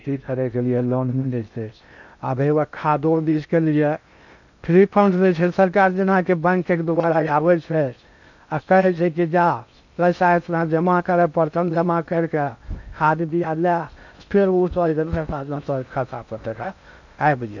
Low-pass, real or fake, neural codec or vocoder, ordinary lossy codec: 7.2 kHz; fake; codec, 16 kHz in and 24 kHz out, 0.6 kbps, FocalCodec, streaming, 2048 codes; none